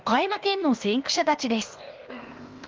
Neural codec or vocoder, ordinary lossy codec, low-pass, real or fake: codec, 16 kHz, 0.8 kbps, ZipCodec; Opus, 32 kbps; 7.2 kHz; fake